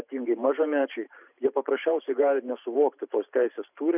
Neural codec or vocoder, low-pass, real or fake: vocoder, 44.1 kHz, 128 mel bands every 512 samples, BigVGAN v2; 3.6 kHz; fake